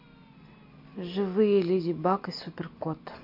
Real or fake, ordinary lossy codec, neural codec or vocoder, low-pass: real; MP3, 32 kbps; none; 5.4 kHz